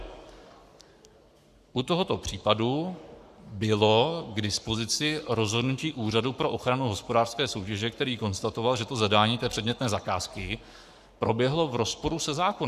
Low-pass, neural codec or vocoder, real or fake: 14.4 kHz; codec, 44.1 kHz, 7.8 kbps, Pupu-Codec; fake